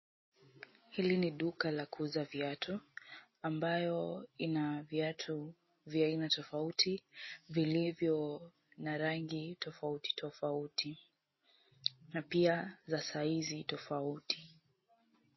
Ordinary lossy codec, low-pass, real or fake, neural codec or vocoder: MP3, 24 kbps; 7.2 kHz; real; none